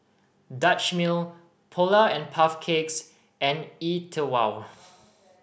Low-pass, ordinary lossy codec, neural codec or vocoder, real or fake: none; none; none; real